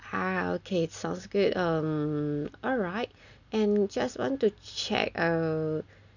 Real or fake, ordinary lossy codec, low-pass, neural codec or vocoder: real; none; 7.2 kHz; none